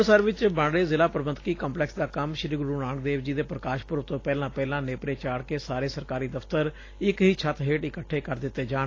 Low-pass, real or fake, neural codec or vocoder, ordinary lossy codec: 7.2 kHz; real; none; AAC, 32 kbps